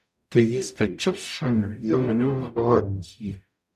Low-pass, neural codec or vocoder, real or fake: 14.4 kHz; codec, 44.1 kHz, 0.9 kbps, DAC; fake